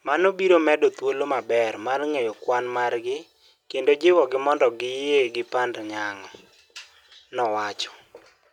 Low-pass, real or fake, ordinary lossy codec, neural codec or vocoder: 19.8 kHz; real; none; none